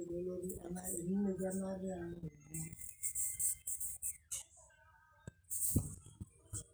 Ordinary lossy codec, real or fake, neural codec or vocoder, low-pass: none; real; none; none